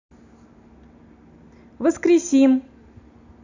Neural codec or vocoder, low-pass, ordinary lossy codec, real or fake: none; 7.2 kHz; AAC, 48 kbps; real